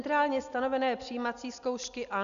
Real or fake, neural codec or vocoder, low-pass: real; none; 7.2 kHz